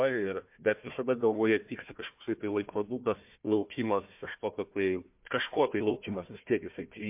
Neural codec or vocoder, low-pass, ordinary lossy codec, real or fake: codec, 16 kHz, 1 kbps, FunCodec, trained on Chinese and English, 50 frames a second; 3.6 kHz; MP3, 32 kbps; fake